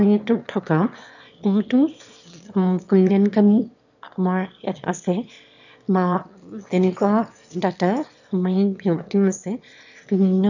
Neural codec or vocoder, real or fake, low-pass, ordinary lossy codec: autoencoder, 22.05 kHz, a latent of 192 numbers a frame, VITS, trained on one speaker; fake; 7.2 kHz; none